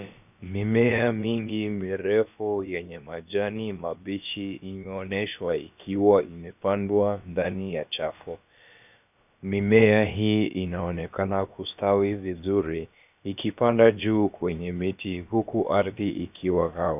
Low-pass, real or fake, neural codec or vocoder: 3.6 kHz; fake; codec, 16 kHz, about 1 kbps, DyCAST, with the encoder's durations